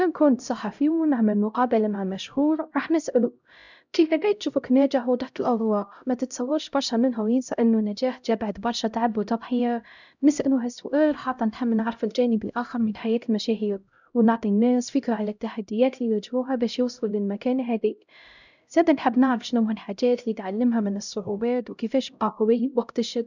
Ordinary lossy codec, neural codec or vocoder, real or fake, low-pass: none; codec, 16 kHz, 0.5 kbps, X-Codec, HuBERT features, trained on LibriSpeech; fake; 7.2 kHz